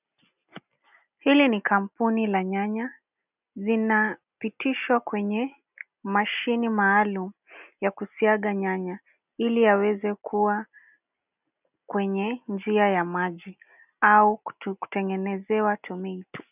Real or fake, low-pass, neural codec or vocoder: real; 3.6 kHz; none